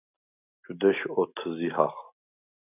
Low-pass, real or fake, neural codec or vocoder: 3.6 kHz; real; none